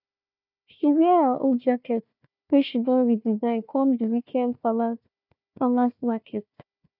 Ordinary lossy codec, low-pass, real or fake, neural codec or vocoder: none; 5.4 kHz; fake; codec, 16 kHz, 1 kbps, FunCodec, trained on Chinese and English, 50 frames a second